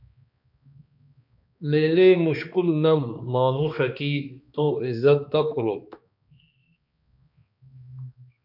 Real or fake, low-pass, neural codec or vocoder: fake; 5.4 kHz; codec, 16 kHz, 2 kbps, X-Codec, HuBERT features, trained on balanced general audio